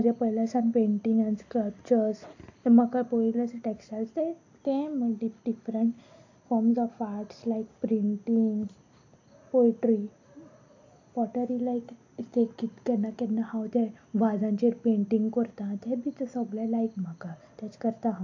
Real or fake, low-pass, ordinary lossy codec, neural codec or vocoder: real; 7.2 kHz; AAC, 48 kbps; none